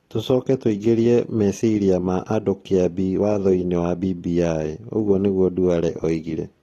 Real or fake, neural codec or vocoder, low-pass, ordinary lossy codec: real; none; 19.8 kHz; AAC, 32 kbps